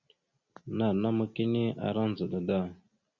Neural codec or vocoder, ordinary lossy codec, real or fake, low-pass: none; Opus, 64 kbps; real; 7.2 kHz